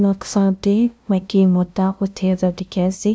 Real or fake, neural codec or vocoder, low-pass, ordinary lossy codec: fake; codec, 16 kHz, 0.5 kbps, FunCodec, trained on LibriTTS, 25 frames a second; none; none